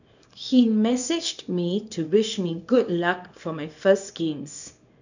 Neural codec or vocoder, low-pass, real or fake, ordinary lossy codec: codec, 24 kHz, 0.9 kbps, WavTokenizer, small release; 7.2 kHz; fake; none